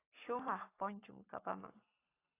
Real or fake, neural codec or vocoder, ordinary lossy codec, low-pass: fake; codec, 44.1 kHz, 7.8 kbps, Pupu-Codec; AAC, 16 kbps; 3.6 kHz